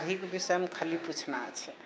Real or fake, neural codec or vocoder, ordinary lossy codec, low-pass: fake; codec, 16 kHz, 6 kbps, DAC; none; none